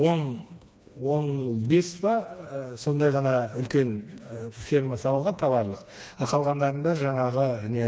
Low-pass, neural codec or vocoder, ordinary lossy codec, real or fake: none; codec, 16 kHz, 2 kbps, FreqCodec, smaller model; none; fake